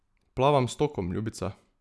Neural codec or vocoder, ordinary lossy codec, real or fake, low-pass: none; none; real; none